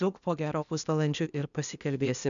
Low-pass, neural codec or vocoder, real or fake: 7.2 kHz; codec, 16 kHz, 0.8 kbps, ZipCodec; fake